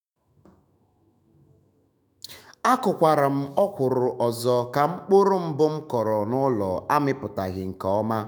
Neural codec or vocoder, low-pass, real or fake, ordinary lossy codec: autoencoder, 48 kHz, 128 numbers a frame, DAC-VAE, trained on Japanese speech; none; fake; none